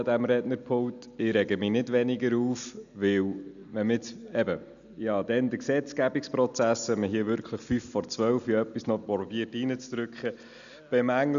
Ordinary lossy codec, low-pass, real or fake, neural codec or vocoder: none; 7.2 kHz; real; none